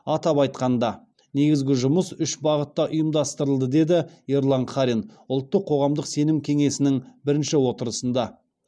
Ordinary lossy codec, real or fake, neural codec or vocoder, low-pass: none; real; none; none